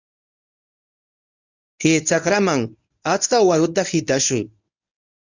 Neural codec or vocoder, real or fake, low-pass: codec, 24 kHz, 0.9 kbps, WavTokenizer, medium speech release version 1; fake; 7.2 kHz